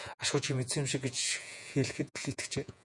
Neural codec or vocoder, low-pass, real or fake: vocoder, 48 kHz, 128 mel bands, Vocos; 10.8 kHz; fake